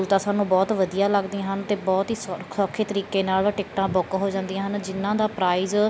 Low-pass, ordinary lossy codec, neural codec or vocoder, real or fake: none; none; none; real